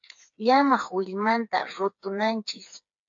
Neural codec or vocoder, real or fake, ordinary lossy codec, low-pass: codec, 16 kHz, 4 kbps, FreqCodec, smaller model; fake; AAC, 64 kbps; 7.2 kHz